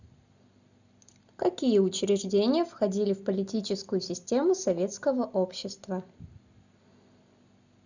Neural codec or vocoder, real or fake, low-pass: none; real; 7.2 kHz